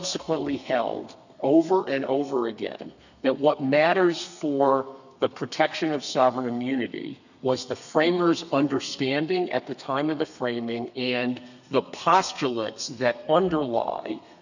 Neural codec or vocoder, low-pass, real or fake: codec, 32 kHz, 1.9 kbps, SNAC; 7.2 kHz; fake